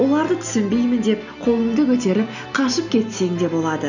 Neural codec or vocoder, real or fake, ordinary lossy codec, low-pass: none; real; none; 7.2 kHz